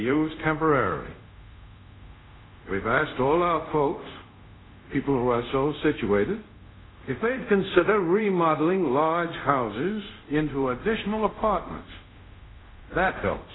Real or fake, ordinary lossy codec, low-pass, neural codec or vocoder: fake; AAC, 16 kbps; 7.2 kHz; codec, 24 kHz, 0.5 kbps, DualCodec